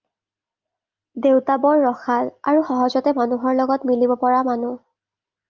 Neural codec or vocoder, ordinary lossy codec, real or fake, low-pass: none; Opus, 24 kbps; real; 7.2 kHz